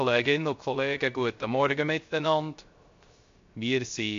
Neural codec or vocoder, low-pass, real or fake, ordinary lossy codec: codec, 16 kHz, 0.3 kbps, FocalCodec; 7.2 kHz; fake; MP3, 48 kbps